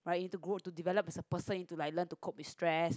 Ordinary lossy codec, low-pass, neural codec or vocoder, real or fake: none; none; none; real